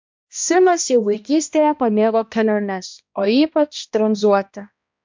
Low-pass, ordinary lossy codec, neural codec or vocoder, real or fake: 7.2 kHz; MP3, 64 kbps; codec, 16 kHz, 1 kbps, X-Codec, HuBERT features, trained on balanced general audio; fake